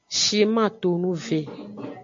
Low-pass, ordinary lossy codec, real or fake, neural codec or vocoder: 7.2 kHz; MP3, 48 kbps; real; none